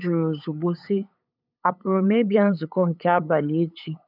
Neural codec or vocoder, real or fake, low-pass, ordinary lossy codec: codec, 16 kHz, 8 kbps, FunCodec, trained on LibriTTS, 25 frames a second; fake; 5.4 kHz; none